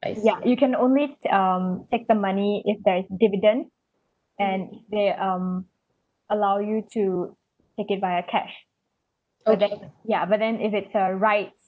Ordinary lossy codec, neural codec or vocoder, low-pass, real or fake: none; none; none; real